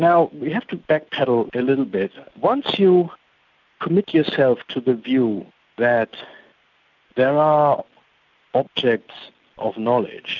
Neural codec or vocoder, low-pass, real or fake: none; 7.2 kHz; real